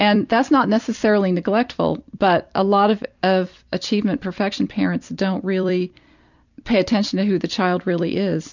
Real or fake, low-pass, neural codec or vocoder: fake; 7.2 kHz; vocoder, 44.1 kHz, 128 mel bands every 256 samples, BigVGAN v2